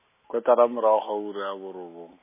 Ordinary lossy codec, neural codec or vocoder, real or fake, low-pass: MP3, 16 kbps; none; real; 3.6 kHz